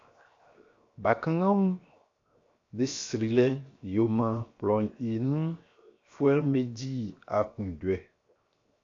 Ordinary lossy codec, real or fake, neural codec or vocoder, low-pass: AAC, 48 kbps; fake; codec, 16 kHz, 0.7 kbps, FocalCodec; 7.2 kHz